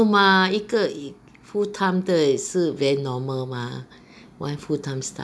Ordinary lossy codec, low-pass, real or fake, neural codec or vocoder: none; none; real; none